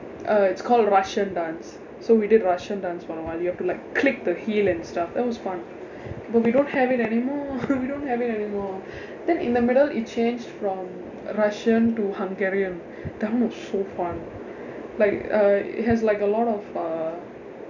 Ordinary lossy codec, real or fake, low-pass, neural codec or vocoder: none; real; 7.2 kHz; none